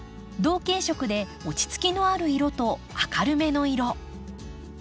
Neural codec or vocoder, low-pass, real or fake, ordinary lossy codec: none; none; real; none